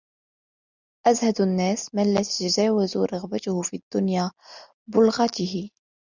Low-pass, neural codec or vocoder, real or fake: 7.2 kHz; none; real